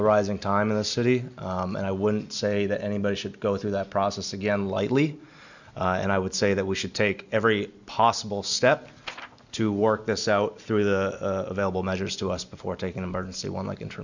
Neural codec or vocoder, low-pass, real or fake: none; 7.2 kHz; real